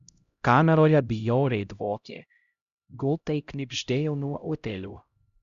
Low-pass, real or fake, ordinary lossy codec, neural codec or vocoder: 7.2 kHz; fake; Opus, 64 kbps; codec, 16 kHz, 0.5 kbps, X-Codec, HuBERT features, trained on LibriSpeech